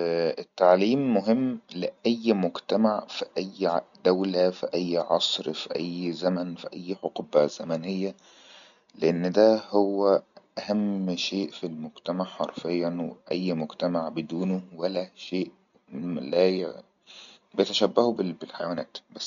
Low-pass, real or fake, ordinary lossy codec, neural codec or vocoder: 7.2 kHz; real; none; none